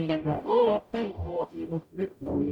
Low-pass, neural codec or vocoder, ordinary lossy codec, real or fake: 19.8 kHz; codec, 44.1 kHz, 0.9 kbps, DAC; none; fake